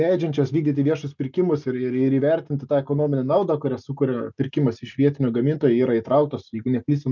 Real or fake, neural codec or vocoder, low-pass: real; none; 7.2 kHz